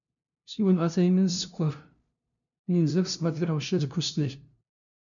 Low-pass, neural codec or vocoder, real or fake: 7.2 kHz; codec, 16 kHz, 0.5 kbps, FunCodec, trained on LibriTTS, 25 frames a second; fake